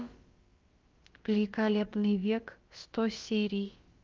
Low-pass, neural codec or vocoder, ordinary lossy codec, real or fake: 7.2 kHz; codec, 16 kHz, about 1 kbps, DyCAST, with the encoder's durations; Opus, 24 kbps; fake